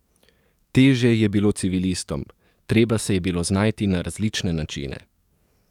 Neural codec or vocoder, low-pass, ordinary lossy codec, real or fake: codec, 44.1 kHz, 7.8 kbps, DAC; 19.8 kHz; none; fake